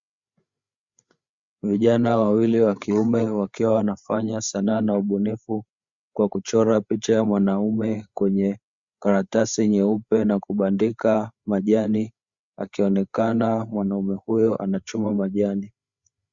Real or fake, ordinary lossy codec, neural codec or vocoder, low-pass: fake; Opus, 64 kbps; codec, 16 kHz, 8 kbps, FreqCodec, larger model; 7.2 kHz